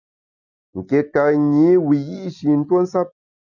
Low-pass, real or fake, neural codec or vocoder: 7.2 kHz; real; none